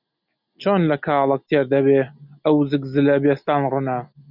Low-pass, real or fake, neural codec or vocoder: 5.4 kHz; real; none